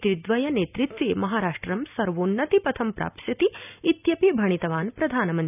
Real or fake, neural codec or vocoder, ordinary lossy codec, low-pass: real; none; none; 3.6 kHz